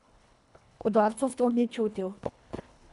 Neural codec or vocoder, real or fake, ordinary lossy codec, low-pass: codec, 24 kHz, 1.5 kbps, HILCodec; fake; none; 10.8 kHz